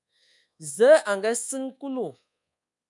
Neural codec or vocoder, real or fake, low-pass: codec, 24 kHz, 1.2 kbps, DualCodec; fake; 10.8 kHz